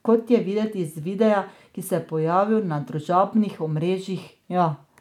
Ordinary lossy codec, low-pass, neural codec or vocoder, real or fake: none; 19.8 kHz; none; real